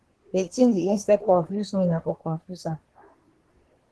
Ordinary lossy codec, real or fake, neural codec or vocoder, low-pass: Opus, 16 kbps; fake; codec, 24 kHz, 1 kbps, SNAC; 10.8 kHz